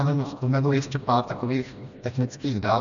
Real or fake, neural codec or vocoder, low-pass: fake; codec, 16 kHz, 1 kbps, FreqCodec, smaller model; 7.2 kHz